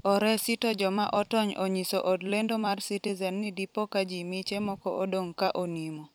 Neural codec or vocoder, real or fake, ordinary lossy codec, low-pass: vocoder, 44.1 kHz, 128 mel bands every 256 samples, BigVGAN v2; fake; none; none